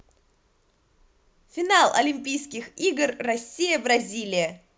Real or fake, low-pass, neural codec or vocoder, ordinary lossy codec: real; none; none; none